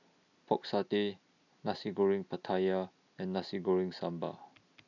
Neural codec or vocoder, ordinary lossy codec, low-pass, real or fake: none; none; 7.2 kHz; real